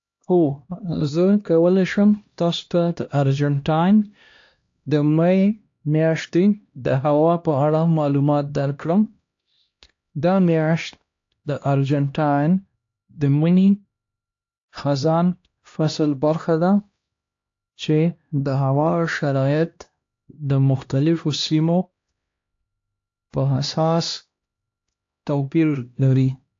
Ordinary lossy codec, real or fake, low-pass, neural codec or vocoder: AAC, 48 kbps; fake; 7.2 kHz; codec, 16 kHz, 1 kbps, X-Codec, HuBERT features, trained on LibriSpeech